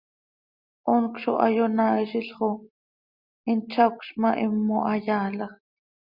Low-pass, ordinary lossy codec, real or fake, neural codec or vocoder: 5.4 kHz; Opus, 64 kbps; real; none